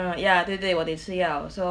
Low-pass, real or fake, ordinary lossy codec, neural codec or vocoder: 9.9 kHz; real; none; none